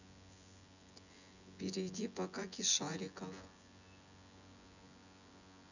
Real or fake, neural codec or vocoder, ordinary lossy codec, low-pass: fake; vocoder, 24 kHz, 100 mel bands, Vocos; none; 7.2 kHz